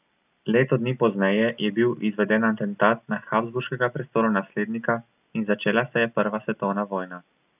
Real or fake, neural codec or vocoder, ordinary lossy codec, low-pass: real; none; none; 3.6 kHz